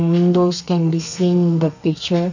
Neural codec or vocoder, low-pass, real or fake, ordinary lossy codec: codec, 32 kHz, 1.9 kbps, SNAC; 7.2 kHz; fake; none